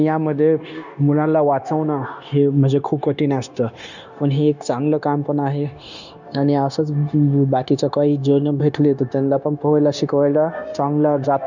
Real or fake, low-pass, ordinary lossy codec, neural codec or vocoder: fake; 7.2 kHz; none; codec, 16 kHz, 0.9 kbps, LongCat-Audio-Codec